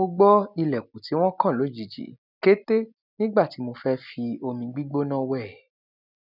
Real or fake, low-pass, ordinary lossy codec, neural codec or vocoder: real; 5.4 kHz; none; none